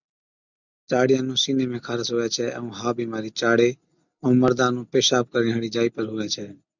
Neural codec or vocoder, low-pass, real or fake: none; 7.2 kHz; real